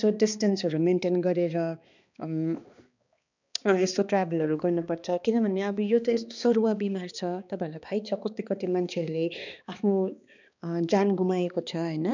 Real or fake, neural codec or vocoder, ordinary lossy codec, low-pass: fake; codec, 16 kHz, 2 kbps, X-Codec, HuBERT features, trained on balanced general audio; none; 7.2 kHz